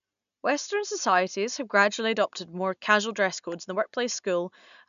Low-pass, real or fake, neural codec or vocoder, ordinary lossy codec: 7.2 kHz; real; none; none